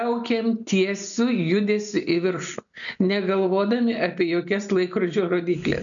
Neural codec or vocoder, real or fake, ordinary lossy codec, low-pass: none; real; MP3, 96 kbps; 7.2 kHz